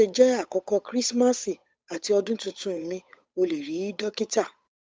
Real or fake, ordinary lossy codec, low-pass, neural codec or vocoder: fake; none; none; codec, 16 kHz, 8 kbps, FunCodec, trained on Chinese and English, 25 frames a second